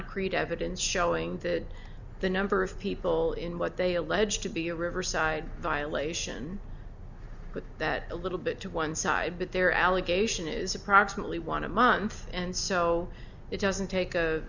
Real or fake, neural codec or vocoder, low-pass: real; none; 7.2 kHz